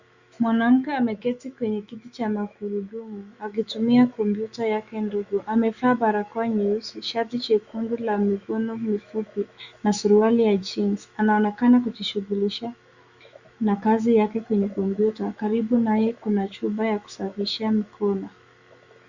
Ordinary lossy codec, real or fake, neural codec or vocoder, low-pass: Opus, 64 kbps; fake; autoencoder, 48 kHz, 128 numbers a frame, DAC-VAE, trained on Japanese speech; 7.2 kHz